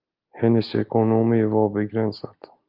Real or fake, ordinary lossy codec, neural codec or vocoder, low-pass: real; Opus, 32 kbps; none; 5.4 kHz